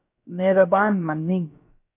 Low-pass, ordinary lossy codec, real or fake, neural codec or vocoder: 3.6 kHz; AAC, 24 kbps; fake; codec, 16 kHz, about 1 kbps, DyCAST, with the encoder's durations